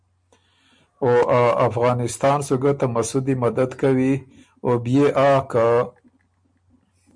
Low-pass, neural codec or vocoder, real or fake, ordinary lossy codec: 9.9 kHz; none; real; MP3, 64 kbps